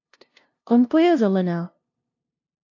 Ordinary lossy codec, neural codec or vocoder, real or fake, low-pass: AAC, 48 kbps; codec, 16 kHz, 0.5 kbps, FunCodec, trained on LibriTTS, 25 frames a second; fake; 7.2 kHz